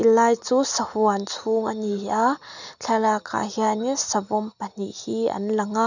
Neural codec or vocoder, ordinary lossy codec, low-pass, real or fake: none; none; 7.2 kHz; real